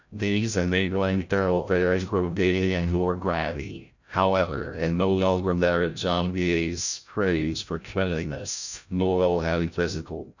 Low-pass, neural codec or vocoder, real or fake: 7.2 kHz; codec, 16 kHz, 0.5 kbps, FreqCodec, larger model; fake